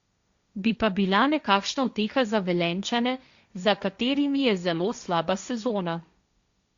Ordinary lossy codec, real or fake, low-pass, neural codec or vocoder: Opus, 64 kbps; fake; 7.2 kHz; codec, 16 kHz, 1.1 kbps, Voila-Tokenizer